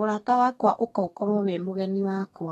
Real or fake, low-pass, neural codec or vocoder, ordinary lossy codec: fake; 14.4 kHz; codec, 32 kHz, 1.9 kbps, SNAC; AAC, 32 kbps